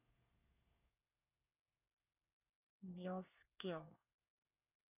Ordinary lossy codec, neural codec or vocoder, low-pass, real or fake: none; codec, 24 kHz, 1 kbps, SNAC; 3.6 kHz; fake